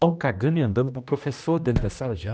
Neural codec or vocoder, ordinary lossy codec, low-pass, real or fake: codec, 16 kHz, 1 kbps, X-Codec, HuBERT features, trained on general audio; none; none; fake